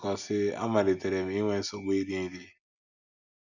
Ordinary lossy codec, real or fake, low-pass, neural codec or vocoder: none; real; 7.2 kHz; none